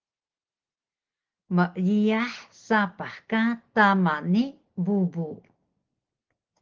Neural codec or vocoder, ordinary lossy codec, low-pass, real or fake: none; Opus, 16 kbps; 7.2 kHz; real